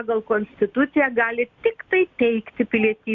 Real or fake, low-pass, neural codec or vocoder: real; 7.2 kHz; none